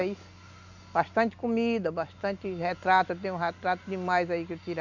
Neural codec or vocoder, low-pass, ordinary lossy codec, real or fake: none; 7.2 kHz; none; real